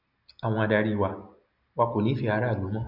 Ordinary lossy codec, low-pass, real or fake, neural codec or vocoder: none; 5.4 kHz; real; none